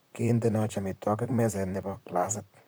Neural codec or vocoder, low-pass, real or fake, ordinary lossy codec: vocoder, 44.1 kHz, 128 mel bands, Pupu-Vocoder; none; fake; none